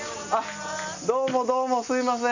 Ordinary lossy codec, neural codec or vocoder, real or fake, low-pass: none; none; real; 7.2 kHz